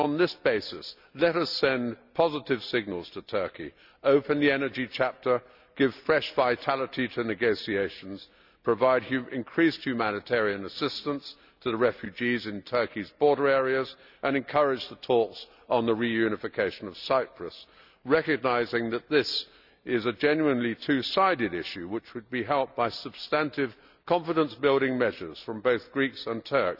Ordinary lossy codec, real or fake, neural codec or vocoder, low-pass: none; real; none; 5.4 kHz